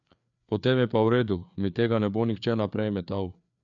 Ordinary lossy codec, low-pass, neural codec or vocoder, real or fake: none; 7.2 kHz; codec, 16 kHz, 4 kbps, FreqCodec, larger model; fake